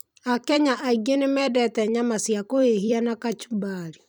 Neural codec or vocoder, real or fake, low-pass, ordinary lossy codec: vocoder, 44.1 kHz, 128 mel bands every 256 samples, BigVGAN v2; fake; none; none